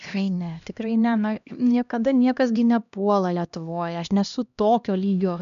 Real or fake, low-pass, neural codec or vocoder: fake; 7.2 kHz; codec, 16 kHz, 1 kbps, X-Codec, HuBERT features, trained on LibriSpeech